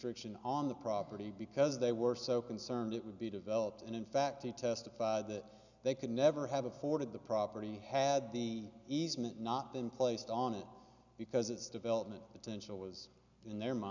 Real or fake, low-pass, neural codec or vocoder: real; 7.2 kHz; none